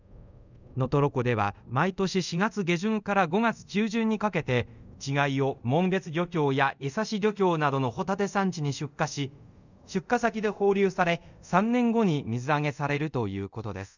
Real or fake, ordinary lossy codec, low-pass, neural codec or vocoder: fake; none; 7.2 kHz; codec, 24 kHz, 0.5 kbps, DualCodec